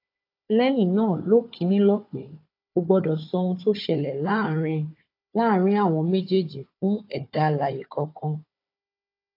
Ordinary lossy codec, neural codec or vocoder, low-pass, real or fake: AAC, 32 kbps; codec, 16 kHz, 4 kbps, FunCodec, trained on Chinese and English, 50 frames a second; 5.4 kHz; fake